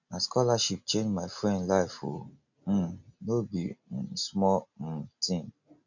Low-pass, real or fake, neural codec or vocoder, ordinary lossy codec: 7.2 kHz; real; none; none